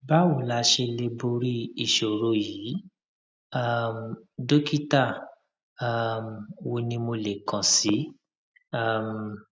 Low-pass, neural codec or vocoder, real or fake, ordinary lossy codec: none; none; real; none